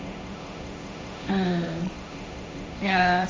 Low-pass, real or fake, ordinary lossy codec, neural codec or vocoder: none; fake; none; codec, 16 kHz, 1.1 kbps, Voila-Tokenizer